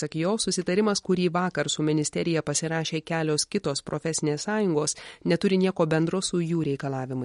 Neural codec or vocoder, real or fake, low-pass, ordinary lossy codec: none; real; 19.8 kHz; MP3, 48 kbps